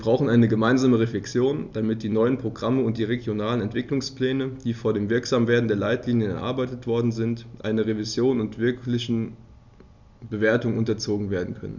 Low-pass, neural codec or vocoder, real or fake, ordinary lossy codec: 7.2 kHz; none; real; none